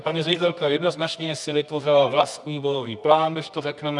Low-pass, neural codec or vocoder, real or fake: 10.8 kHz; codec, 24 kHz, 0.9 kbps, WavTokenizer, medium music audio release; fake